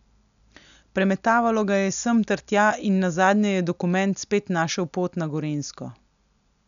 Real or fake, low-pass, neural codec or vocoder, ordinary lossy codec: real; 7.2 kHz; none; none